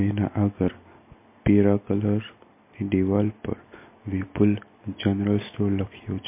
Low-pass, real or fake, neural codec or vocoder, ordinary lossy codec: 3.6 kHz; real; none; MP3, 24 kbps